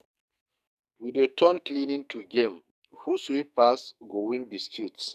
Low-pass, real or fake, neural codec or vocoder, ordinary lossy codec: 14.4 kHz; fake; codec, 44.1 kHz, 2.6 kbps, SNAC; none